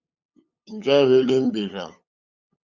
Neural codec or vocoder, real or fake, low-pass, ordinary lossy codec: codec, 16 kHz, 8 kbps, FunCodec, trained on LibriTTS, 25 frames a second; fake; 7.2 kHz; Opus, 64 kbps